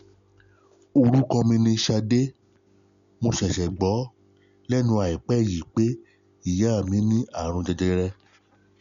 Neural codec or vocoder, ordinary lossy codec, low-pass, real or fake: none; none; 7.2 kHz; real